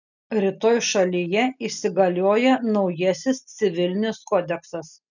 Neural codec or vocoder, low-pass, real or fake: none; 7.2 kHz; real